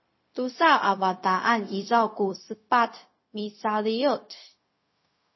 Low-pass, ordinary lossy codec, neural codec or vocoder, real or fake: 7.2 kHz; MP3, 24 kbps; codec, 16 kHz, 0.4 kbps, LongCat-Audio-Codec; fake